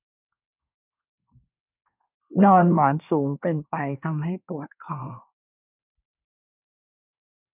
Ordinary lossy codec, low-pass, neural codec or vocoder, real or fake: none; 3.6 kHz; codec, 24 kHz, 1 kbps, SNAC; fake